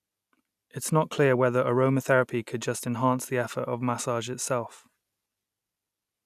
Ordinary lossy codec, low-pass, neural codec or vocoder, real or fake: none; 14.4 kHz; none; real